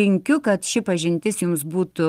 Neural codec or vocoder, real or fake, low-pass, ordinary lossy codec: none; real; 14.4 kHz; Opus, 24 kbps